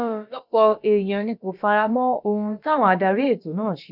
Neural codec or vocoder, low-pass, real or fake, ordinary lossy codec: codec, 16 kHz, about 1 kbps, DyCAST, with the encoder's durations; 5.4 kHz; fake; none